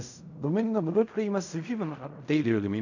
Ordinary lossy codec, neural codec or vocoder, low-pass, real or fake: none; codec, 16 kHz in and 24 kHz out, 0.4 kbps, LongCat-Audio-Codec, fine tuned four codebook decoder; 7.2 kHz; fake